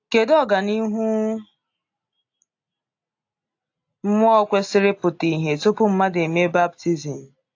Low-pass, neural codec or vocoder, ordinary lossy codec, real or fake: 7.2 kHz; none; AAC, 48 kbps; real